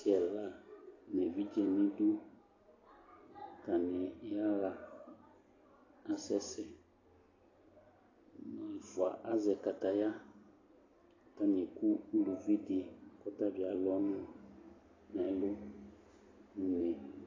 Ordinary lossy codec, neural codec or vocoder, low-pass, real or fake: AAC, 32 kbps; none; 7.2 kHz; real